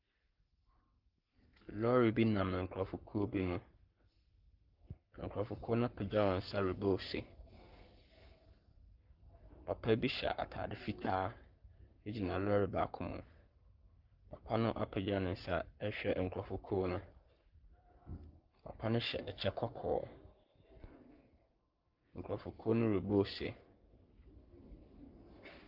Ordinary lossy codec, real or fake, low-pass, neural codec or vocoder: Opus, 24 kbps; fake; 5.4 kHz; codec, 44.1 kHz, 7.8 kbps, Pupu-Codec